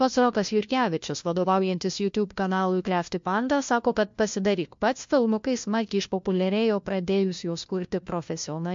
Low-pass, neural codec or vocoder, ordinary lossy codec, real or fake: 7.2 kHz; codec, 16 kHz, 1 kbps, FunCodec, trained on LibriTTS, 50 frames a second; MP3, 48 kbps; fake